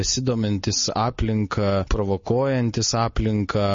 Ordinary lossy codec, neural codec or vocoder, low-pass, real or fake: MP3, 32 kbps; none; 7.2 kHz; real